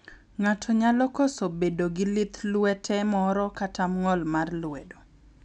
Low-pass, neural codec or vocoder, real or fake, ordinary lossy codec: 10.8 kHz; none; real; none